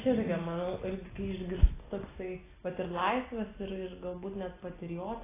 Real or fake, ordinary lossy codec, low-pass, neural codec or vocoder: real; AAC, 16 kbps; 3.6 kHz; none